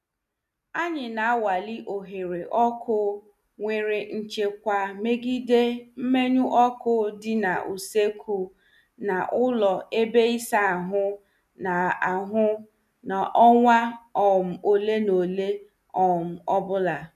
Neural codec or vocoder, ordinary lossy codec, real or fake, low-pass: none; none; real; 14.4 kHz